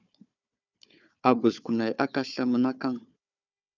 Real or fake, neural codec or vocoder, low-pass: fake; codec, 16 kHz, 4 kbps, FunCodec, trained on Chinese and English, 50 frames a second; 7.2 kHz